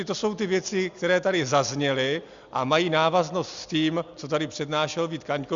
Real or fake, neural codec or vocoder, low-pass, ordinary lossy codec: real; none; 7.2 kHz; Opus, 64 kbps